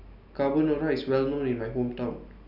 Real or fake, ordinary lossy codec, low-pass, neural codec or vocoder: real; none; 5.4 kHz; none